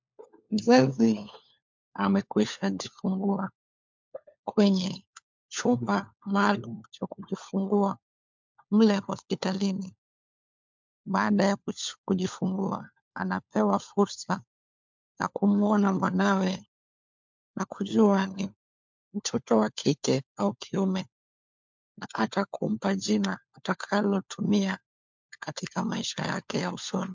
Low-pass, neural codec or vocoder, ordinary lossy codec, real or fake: 7.2 kHz; codec, 16 kHz, 4 kbps, FunCodec, trained on LibriTTS, 50 frames a second; MP3, 64 kbps; fake